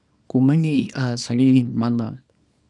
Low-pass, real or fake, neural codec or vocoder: 10.8 kHz; fake; codec, 24 kHz, 0.9 kbps, WavTokenizer, small release